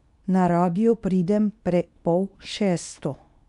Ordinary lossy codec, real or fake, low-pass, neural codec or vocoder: MP3, 96 kbps; fake; 10.8 kHz; codec, 24 kHz, 0.9 kbps, WavTokenizer, medium speech release version 2